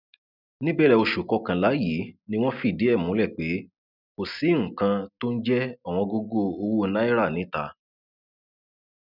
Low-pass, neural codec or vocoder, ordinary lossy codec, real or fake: 5.4 kHz; none; none; real